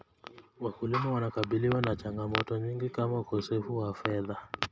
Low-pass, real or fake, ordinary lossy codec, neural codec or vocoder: none; real; none; none